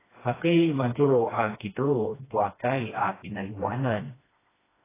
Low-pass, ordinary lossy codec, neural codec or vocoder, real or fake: 3.6 kHz; AAC, 16 kbps; codec, 16 kHz, 1 kbps, FreqCodec, smaller model; fake